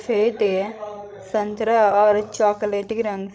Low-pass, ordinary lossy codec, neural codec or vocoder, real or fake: none; none; codec, 16 kHz, 16 kbps, FreqCodec, larger model; fake